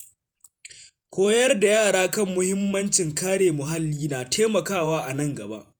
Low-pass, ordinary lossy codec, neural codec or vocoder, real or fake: none; none; vocoder, 48 kHz, 128 mel bands, Vocos; fake